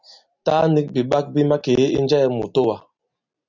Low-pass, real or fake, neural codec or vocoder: 7.2 kHz; real; none